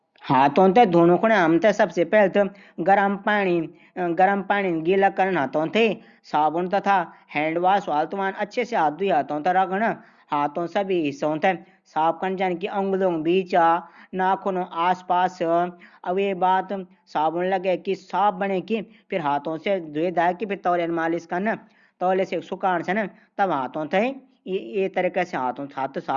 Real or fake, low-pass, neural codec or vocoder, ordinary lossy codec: real; 7.2 kHz; none; Opus, 64 kbps